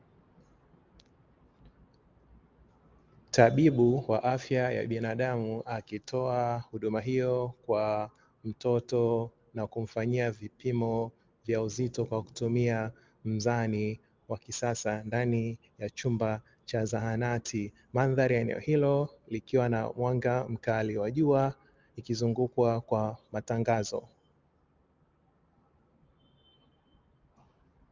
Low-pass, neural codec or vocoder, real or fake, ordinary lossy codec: 7.2 kHz; none; real; Opus, 24 kbps